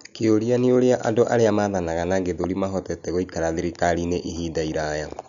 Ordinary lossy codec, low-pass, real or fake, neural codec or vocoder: none; 7.2 kHz; real; none